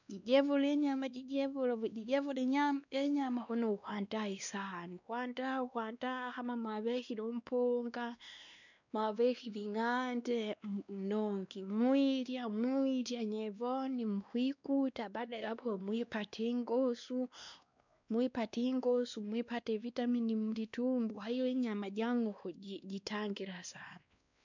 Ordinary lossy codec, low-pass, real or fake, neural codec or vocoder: none; 7.2 kHz; fake; codec, 16 kHz, 2 kbps, X-Codec, WavLM features, trained on Multilingual LibriSpeech